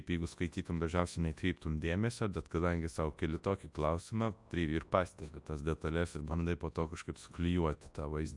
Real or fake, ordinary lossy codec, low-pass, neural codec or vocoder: fake; AAC, 64 kbps; 10.8 kHz; codec, 24 kHz, 0.9 kbps, WavTokenizer, large speech release